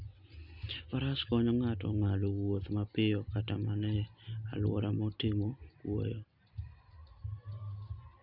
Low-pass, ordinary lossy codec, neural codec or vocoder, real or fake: 5.4 kHz; none; none; real